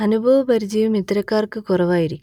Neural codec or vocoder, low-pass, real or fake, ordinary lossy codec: none; 19.8 kHz; real; none